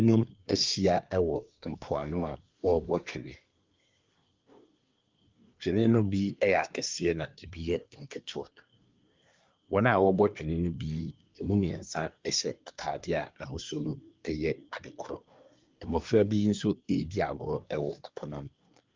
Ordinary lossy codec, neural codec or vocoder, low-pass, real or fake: Opus, 24 kbps; codec, 24 kHz, 1 kbps, SNAC; 7.2 kHz; fake